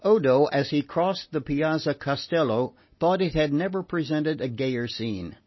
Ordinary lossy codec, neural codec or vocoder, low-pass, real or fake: MP3, 24 kbps; none; 7.2 kHz; real